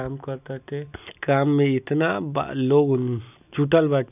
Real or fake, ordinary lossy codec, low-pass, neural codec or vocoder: real; none; 3.6 kHz; none